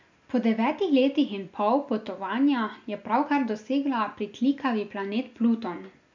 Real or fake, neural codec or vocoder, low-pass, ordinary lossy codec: real; none; 7.2 kHz; MP3, 64 kbps